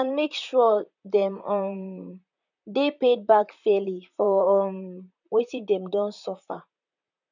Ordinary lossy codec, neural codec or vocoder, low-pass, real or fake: none; vocoder, 44.1 kHz, 128 mel bands, Pupu-Vocoder; 7.2 kHz; fake